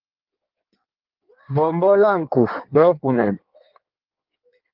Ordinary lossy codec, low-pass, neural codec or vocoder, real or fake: Opus, 24 kbps; 5.4 kHz; codec, 16 kHz in and 24 kHz out, 1.1 kbps, FireRedTTS-2 codec; fake